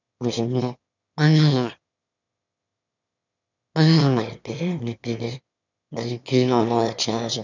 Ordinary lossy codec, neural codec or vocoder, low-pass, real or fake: none; autoencoder, 22.05 kHz, a latent of 192 numbers a frame, VITS, trained on one speaker; 7.2 kHz; fake